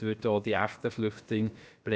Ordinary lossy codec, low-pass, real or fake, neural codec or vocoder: none; none; fake; codec, 16 kHz, about 1 kbps, DyCAST, with the encoder's durations